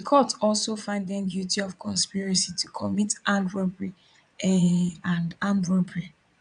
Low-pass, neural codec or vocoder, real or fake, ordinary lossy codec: 9.9 kHz; vocoder, 22.05 kHz, 80 mel bands, Vocos; fake; none